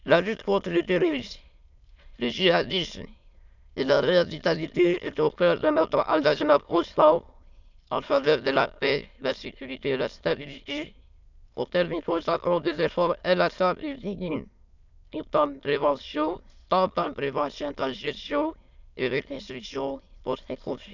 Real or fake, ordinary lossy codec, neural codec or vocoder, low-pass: fake; none; autoencoder, 22.05 kHz, a latent of 192 numbers a frame, VITS, trained on many speakers; 7.2 kHz